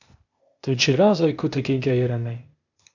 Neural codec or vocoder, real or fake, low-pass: codec, 16 kHz, 0.8 kbps, ZipCodec; fake; 7.2 kHz